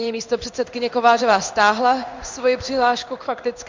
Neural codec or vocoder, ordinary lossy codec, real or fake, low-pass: codec, 16 kHz in and 24 kHz out, 1 kbps, XY-Tokenizer; MP3, 64 kbps; fake; 7.2 kHz